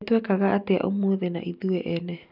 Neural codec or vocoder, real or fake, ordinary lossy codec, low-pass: none; real; none; 5.4 kHz